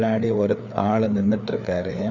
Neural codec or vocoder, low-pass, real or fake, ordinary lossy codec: codec, 16 kHz, 4 kbps, FreqCodec, larger model; 7.2 kHz; fake; none